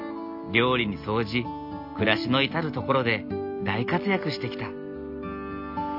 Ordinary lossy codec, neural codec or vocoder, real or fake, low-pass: AAC, 48 kbps; none; real; 5.4 kHz